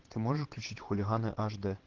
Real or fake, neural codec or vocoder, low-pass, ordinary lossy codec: fake; vocoder, 22.05 kHz, 80 mel bands, WaveNeXt; 7.2 kHz; Opus, 16 kbps